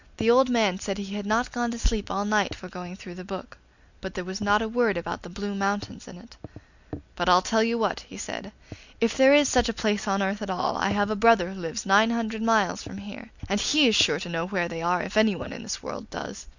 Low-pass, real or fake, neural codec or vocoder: 7.2 kHz; real; none